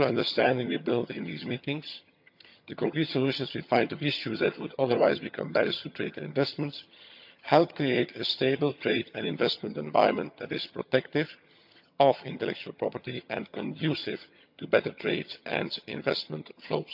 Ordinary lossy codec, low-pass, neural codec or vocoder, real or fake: none; 5.4 kHz; vocoder, 22.05 kHz, 80 mel bands, HiFi-GAN; fake